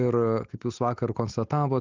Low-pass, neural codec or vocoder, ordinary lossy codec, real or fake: 7.2 kHz; none; Opus, 32 kbps; real